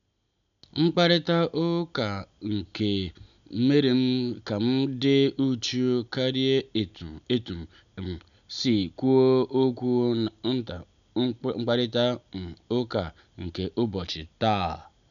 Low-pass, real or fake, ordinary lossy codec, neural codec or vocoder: 7.2 kHz; real; none; none